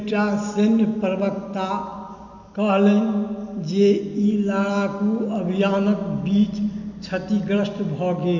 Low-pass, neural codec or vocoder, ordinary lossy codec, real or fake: 7.2 kHz; none; none; real